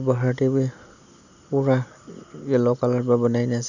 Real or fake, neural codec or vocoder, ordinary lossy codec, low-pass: real; none; none; 7.2 kHz